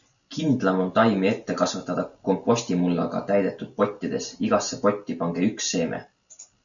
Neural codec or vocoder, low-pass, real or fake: none; 7.2 kHz; real